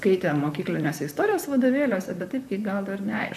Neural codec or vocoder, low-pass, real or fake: vocoder, 44.1 kHz, 128 mel bands, Pupu-Vocoder; 14.4 kHz; fake